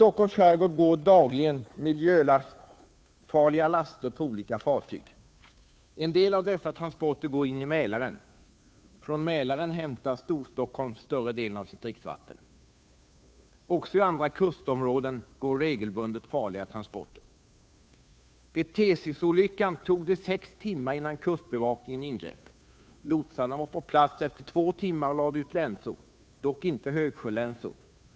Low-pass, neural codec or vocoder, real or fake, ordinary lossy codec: none; codec, 16 kHz, 2 kbps, FunCodec, trained on Chinese and English, 25 frames a second; fake; none